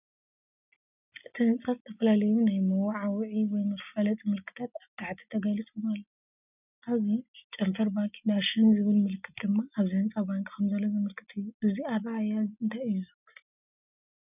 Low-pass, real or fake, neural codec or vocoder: 3.6 kHz; real; none